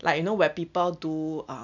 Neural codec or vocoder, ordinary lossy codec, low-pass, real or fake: none; none; 7.2 kHz; real